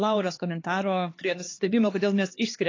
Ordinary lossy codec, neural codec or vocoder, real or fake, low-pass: AAC, 32 kbps; codec, 16 kHz, 2 kbps, X-Codec, HuBERT features, trained on LibriSpeech; fake; 7.2 kHz